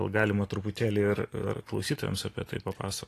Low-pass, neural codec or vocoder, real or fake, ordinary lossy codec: 14.4 kHz; none; real; AAC, 48 kbps